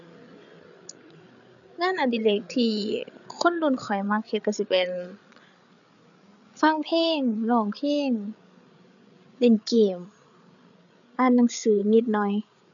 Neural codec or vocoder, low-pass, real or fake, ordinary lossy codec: codec, 16 kHz, 8 kbps, FreqCodec, larger model; 7.2 kHz; fake; none